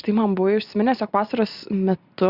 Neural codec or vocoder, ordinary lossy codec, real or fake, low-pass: none; Opus, 64 kbps; real; 5.4 kHz